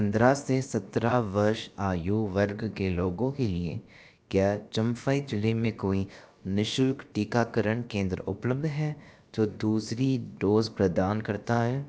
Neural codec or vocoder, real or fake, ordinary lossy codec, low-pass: codec, 16 kHz, about 1 kbps, DyCAST, with the encoder's durations; fake; none; none